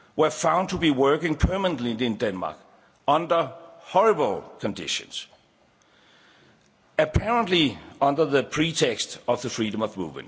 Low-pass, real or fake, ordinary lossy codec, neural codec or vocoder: none; real; none; none